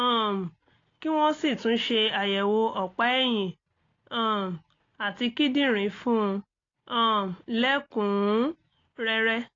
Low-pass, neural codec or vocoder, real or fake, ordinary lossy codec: 7.2 kHz; none; real; AAC, 32 kbps